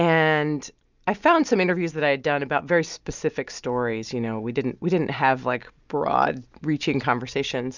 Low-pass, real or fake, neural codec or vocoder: 7.2 kHz; real; none